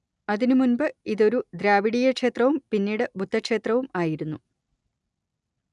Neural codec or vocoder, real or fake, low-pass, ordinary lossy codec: none; real; 10.8 kHz; none